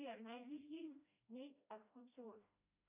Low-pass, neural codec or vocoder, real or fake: 3.6 kHz; codec, 16 kHz, 1 kbps, FreqCodec, smaller model; fake